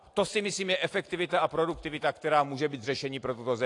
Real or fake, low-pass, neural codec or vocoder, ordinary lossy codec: real; 10.8 kHz; none; AAC, 48 kbps